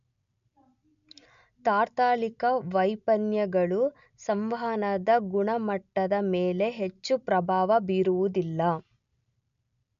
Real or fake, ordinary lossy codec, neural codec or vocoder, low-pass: real; none; none; 7.2 kHz